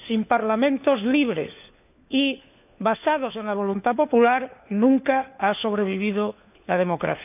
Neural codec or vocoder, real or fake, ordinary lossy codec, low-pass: codec, 16 kHz, 4 kbps, FunCodec, trained on LibriTTS, 50 frames a second; fake; none; 3.6 kHz